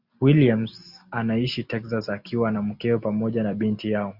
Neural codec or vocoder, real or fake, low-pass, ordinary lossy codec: none; real; 5.4 kHz; Opus, 64 kbps